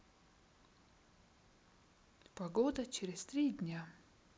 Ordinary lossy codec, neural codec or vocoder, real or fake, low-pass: none; none; real; none